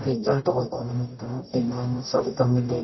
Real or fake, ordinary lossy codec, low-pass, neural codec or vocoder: fake; MP3, 24 kbps; 7.2 kHz; codec, 44.1 kHz, 0.9 kbps, DAC